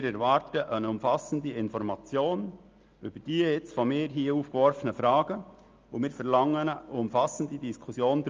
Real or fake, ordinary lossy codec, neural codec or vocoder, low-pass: real; Opus, 24 kbps; none; 7.2 kHz